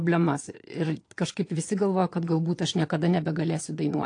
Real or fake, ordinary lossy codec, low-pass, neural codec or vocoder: fake; AAC, 48 kbps; 9.9 kHz; vocoder, 22.05 kHz, 80 mel bands, Vocos